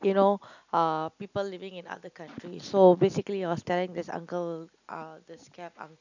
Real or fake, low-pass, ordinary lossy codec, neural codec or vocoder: real; 7.2 kHz; none; none